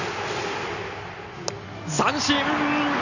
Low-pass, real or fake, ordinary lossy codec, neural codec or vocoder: 7.2 kHz; real; none; none